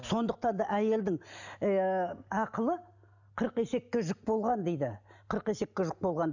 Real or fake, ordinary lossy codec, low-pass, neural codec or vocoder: real; none; 7.2 kHz; none